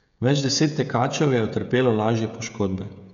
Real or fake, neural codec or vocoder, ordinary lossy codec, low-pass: fake; codec, 16 kHz, 16 kbps, FreqCodec, smaller model; none; 7.2 kHz